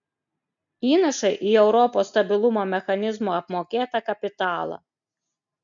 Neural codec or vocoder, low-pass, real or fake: none; 7.2 kHz; real